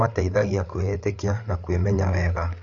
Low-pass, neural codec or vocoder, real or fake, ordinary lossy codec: 7.2 kHz; codec, 16 kHz, 8 kbps, FreqCodec, larger model; fake; none